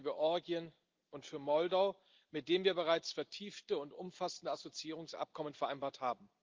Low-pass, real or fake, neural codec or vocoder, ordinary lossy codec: 7.2 kHz; real; none; Opus, 32 kbps